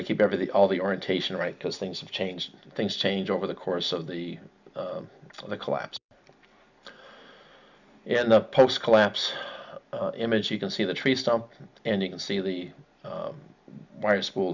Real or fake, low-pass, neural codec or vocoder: real; 7.2 kHz; none